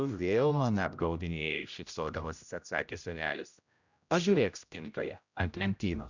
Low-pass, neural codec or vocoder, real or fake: 7.2 kHz; codec, 16 kHz, 0.5 kbps, X-Codec, HuBERT features, trained on general audio; fake